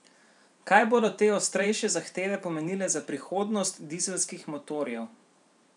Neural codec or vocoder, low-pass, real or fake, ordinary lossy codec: vocoder, 44.1 kHz, 128 mel bands every 512 samples, BigVGAN v2; 10.8 kHz; fake; none